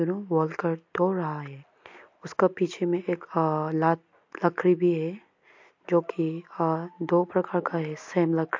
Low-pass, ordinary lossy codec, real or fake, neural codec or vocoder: 7.2 kHz; MP3, 48 kbps; real; none